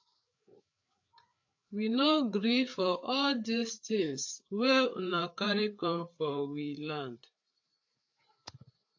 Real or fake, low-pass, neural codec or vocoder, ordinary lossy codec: fake; 7.2 kHz; codec, 16 kHz, 4 kbps, FreqCodec, larger model; AAC, 48 kbps